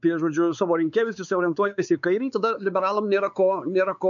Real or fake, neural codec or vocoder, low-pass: fake; codec, 16 kHz, 4 kbps, X-Codec, WavLM features, trained on Multilingual LibriSpeech; 7.2 kHz